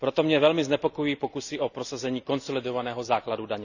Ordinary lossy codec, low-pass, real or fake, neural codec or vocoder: none; 7.2 kHz; real; none